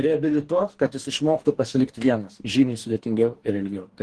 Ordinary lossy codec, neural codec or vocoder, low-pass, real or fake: Opus, 16 kbps; codec, 44.1 kHz, 2.6 kbps, DAC; 10.8 kHz; fake